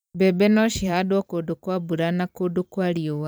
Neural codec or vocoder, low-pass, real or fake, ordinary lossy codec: vocoder, 44.1 kHz, 128 mel bands every 256 samples, BigVGAN v2; none; fake; none